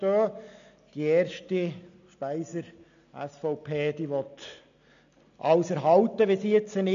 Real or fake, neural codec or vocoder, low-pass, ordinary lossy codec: real; none; 7.2 kHz; AAC, 96 kbps